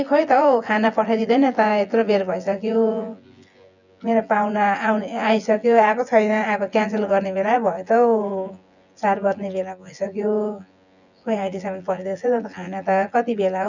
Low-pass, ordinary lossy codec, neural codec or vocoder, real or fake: 7.2 kHz; none; vocoder, 24 kHz, 100 mel bands, Vocos; fake